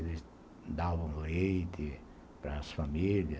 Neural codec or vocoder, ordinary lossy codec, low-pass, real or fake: none; none; none; real